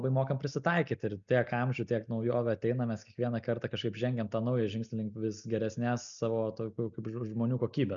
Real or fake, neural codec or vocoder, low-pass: real; none; 7.2 kHz